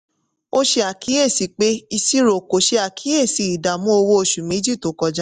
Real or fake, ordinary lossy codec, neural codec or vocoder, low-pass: real; none; none; 9.9 kHz